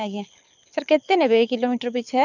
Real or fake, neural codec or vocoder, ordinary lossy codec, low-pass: fake; codec, 24 kHz, 6 kbps, HILCodec; none; 7.2 kHz